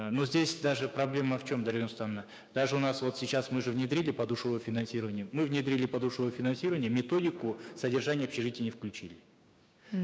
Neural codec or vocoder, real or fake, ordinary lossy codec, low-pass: codec, 16 kHz, 6 kbps, DAC; fake; none; none